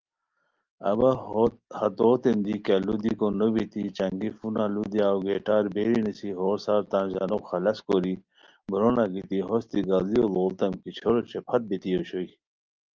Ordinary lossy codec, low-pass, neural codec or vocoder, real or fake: Opus, 32 kbps; 7.2 kHz; none; real